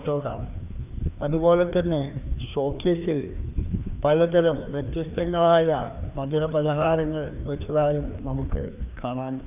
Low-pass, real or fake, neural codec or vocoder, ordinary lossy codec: 3.6 kHz; fake; codec, 16 kHz, 2 kbps, FreqCodec, larger model; none